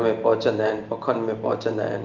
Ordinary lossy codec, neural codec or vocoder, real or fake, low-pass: Opus, 24 kbps; none; real; 7.2 kHz